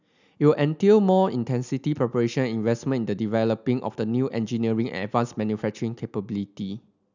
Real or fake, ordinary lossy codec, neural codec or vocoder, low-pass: real; none; none; 7.2 kHz